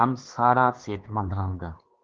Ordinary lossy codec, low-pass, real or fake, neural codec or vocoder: Opus, 16 kbps; 7.2 kHz; fake; codec, 16 kHz, 2 kbps, X-Codec, HuBERT features, trained on LibriSpeech